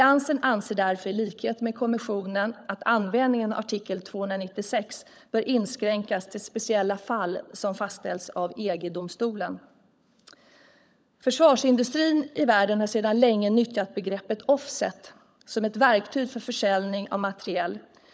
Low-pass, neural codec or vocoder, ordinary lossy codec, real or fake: none; codec, 16 kHz, 16 kbps, FunCodec, trained on LibriTTS, 50 frames a second; none; fake